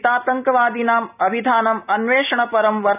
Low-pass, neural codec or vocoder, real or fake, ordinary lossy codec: 3.6 kHz; none; real; none